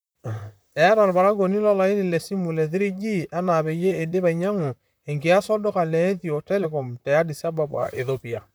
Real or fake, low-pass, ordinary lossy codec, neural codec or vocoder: fake; none; none; vocoder, 44.1 kHz, 128 mel bands, Pupu-Vocoder